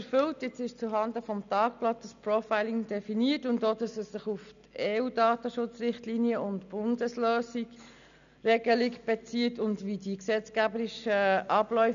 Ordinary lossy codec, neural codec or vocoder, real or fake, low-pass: none; none; real; 7.2 kHz